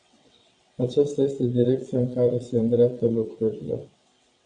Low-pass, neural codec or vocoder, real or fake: 9.9 kHz; vocoder, 22.05 kHz, 80 mel bands, WaveNeXt; fake